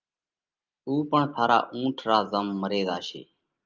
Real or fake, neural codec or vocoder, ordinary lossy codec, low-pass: real; none; Opus, 24 kbps; 7.2 kHz